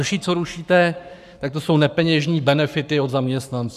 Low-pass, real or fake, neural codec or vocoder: 14.4 kHz; fake; codec, 44.1 kHz, 7.8 kbps, Pupu-Codec